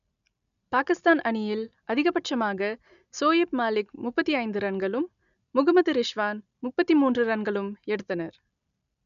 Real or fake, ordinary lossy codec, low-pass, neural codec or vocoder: real; none; 7.2 kHz; none